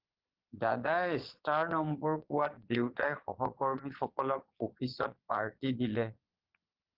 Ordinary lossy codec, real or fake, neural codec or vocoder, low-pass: Opus, 16 kbps; fake; vocoder, 22.05 kHz, 80 mel bands, Vocos; 5.4 kHz